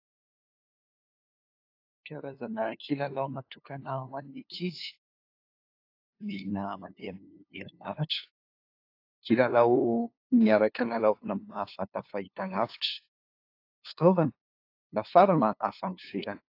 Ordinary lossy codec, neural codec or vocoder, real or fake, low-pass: AAC, 32 kbps; codec, 16 kHz, 2 kbps, FunCodec, trained on LibriTTS, 25 frames a second; fake; 5.4 kHz